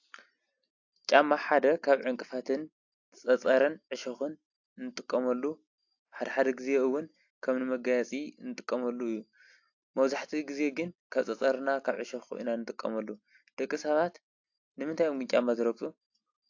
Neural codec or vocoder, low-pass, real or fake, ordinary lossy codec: none; 7.2 kHz; real; AAC, 48 kbps